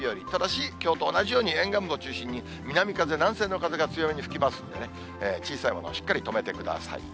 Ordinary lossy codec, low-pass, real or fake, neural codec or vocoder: none; none; real; none